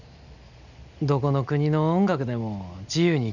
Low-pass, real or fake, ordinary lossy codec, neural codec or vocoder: 7.2 kHz; real; none; none